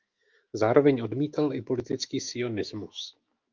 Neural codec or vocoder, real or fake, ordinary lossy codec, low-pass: autoencoder, 48 kHz, 128 numbers a frame, DAC-VAE, trained on Japanese speech; fake; Opus, 24 kbps; 7.2 kHz